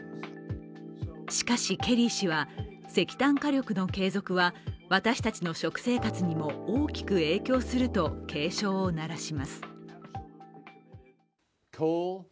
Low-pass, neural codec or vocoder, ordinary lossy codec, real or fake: none; none; none; real